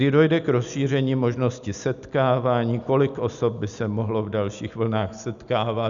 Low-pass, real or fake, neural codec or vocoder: 7.2 kHz; real; none